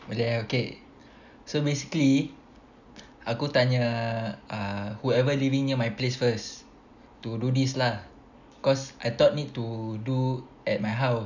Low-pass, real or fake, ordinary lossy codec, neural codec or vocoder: 7.2 kHz; real; none; none